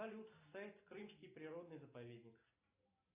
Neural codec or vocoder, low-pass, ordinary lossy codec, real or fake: none; 3.6 kHz; AAC, 24 kbps; real